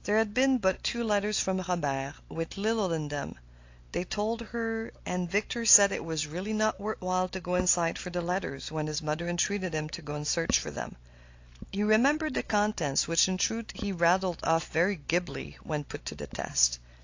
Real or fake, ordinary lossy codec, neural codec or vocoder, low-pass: real; AAC, 48 kbps; none; 7.2 kHz